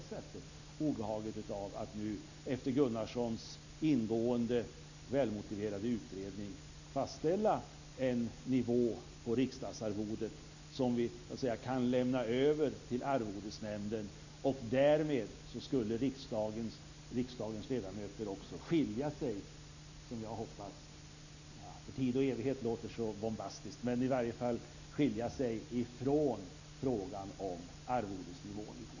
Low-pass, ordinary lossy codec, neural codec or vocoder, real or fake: 7.2 kHz; none; none; real